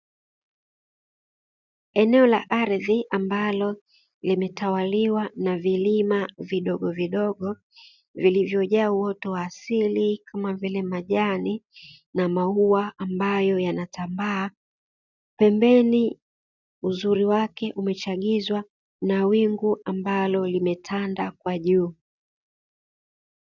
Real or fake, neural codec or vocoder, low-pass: real; none; 7.2 kHz